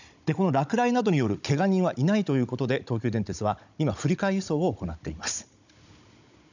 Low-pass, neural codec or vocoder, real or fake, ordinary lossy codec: 7.2 kHz; codec, 16 kHz, 16 kbps, FunCodec, trained on Chinese and English, 50 frames a second; fake; none